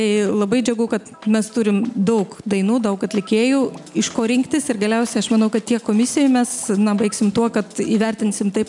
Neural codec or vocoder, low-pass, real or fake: none; 10.8 kHz; real